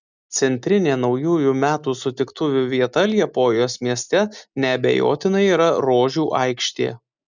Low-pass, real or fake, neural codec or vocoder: 7.2 kHz; real; none